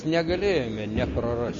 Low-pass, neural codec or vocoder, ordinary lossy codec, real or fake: 7.2 kHz; none; MP3, 32 kbps; real